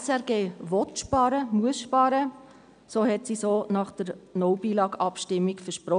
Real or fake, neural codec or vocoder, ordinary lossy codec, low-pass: real; none; none; 9.9 kHz